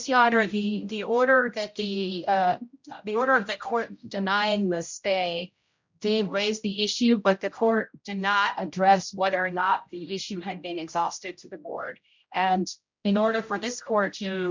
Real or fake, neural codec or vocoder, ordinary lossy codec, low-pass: fake; codec, 16 kHz, 0.5 kbps, X-Codec, HuBERT features, trained on general audio; MP3, 64 kbps; 7.2 kHz